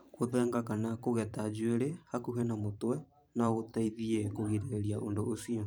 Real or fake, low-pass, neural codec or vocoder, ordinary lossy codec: fake; none; vocoder, 44.1 kHz, 128 mel bands every 512 samples, BigVGAN v2; none